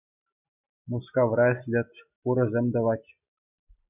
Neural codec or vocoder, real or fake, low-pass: none; real; 3.6 kHz